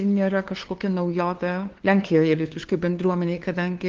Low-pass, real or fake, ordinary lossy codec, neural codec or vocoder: 7.2 kHz; fake; Opus, 16 kbps; codec, 16 kHz, 2 kbps, FunCodec, trained on LibriTTS, 25 frames a second